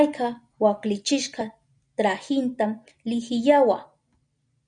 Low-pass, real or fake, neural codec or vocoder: 9.9 kHz; real; none